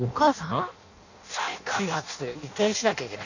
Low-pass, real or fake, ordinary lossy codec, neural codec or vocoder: 7.2 kHz; fake; none; codec, 16 kHz in and 24 kHz out, 0.6 kbps, FireRedTTS-2 codec